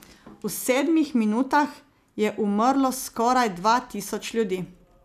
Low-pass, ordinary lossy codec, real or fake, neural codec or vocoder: 14.4 kHz; none; real; none